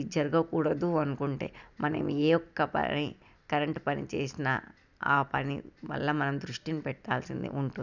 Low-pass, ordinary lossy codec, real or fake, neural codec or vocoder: 7.2 kHz; none; real; none